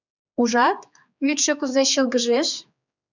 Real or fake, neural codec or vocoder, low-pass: fake; codec, 16 kHz, 4 kbps, X-Codec, HuBERT features, trained on general audio; 7.2 kHz